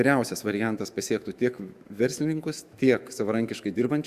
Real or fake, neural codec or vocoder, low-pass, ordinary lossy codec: fake; autoencoder, 48 kHz, 128 numbers a frame, DAC-VAE, trained on Japanese speech; 14.4 kHz; Opus, 64 kbps